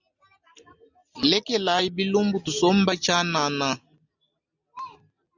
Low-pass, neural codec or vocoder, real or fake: 7.2 kHz; none; real